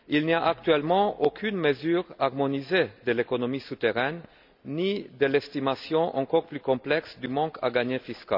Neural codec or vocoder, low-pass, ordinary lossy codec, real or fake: none; 5.4 kHz; none; real